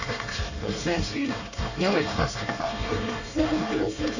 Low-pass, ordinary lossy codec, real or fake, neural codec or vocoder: 7.2 kHz; none; fake; codec, 24 kHz, 1 kbps, SNAC